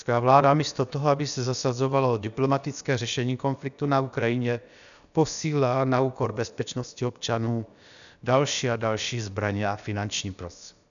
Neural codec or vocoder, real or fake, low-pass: codec, 16 kHz, about 1 kbps, DyCAST, with the encoder's durations; fake; 7.2 kHz